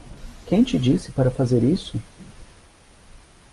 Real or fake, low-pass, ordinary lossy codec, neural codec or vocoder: real; 10.8 kHz; Opus, 32 kbps; none